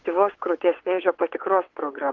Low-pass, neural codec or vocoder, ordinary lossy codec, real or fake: 7.2 kHz; vocoder, 22.05 kHz, 80 mel bands, WaveNeXt; Opus, 16 kbps; fake